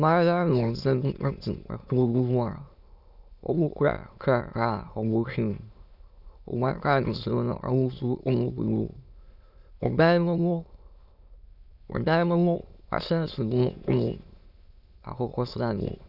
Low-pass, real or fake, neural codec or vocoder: 5.4 kHz; fake; autoencoder, 22.05 kHz, a latent of 192 numbers a frame, VITS, trained on many speakers